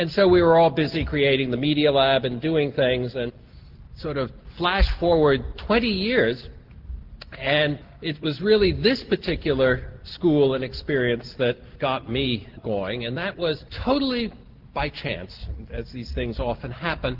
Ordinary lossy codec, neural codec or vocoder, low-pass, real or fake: Opus, 16 kbps; none; 5.4 kHz; real